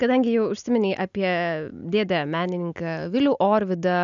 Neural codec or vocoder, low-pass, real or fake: none; 7.2 kHz; real